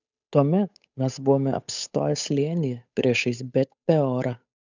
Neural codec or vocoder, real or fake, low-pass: codec, 16 kHz, 8 kbps, FunCodec, trained on Chinese and English, 25 frames a second; fake; 7.2 kHz